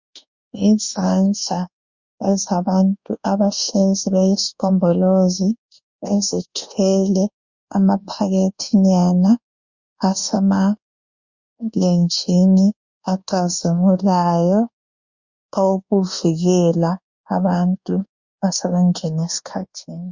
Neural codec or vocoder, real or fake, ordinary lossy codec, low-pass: codec, 24 kHz, 1.2 kbps, DualCodec; fake; Opus, 64 kbps; 7.2 kHz